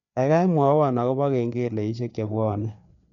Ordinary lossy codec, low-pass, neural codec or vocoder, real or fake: none; 7.2 kHz; codec, 16 kHz, 4 kbps, FreqCodec, larger model; fake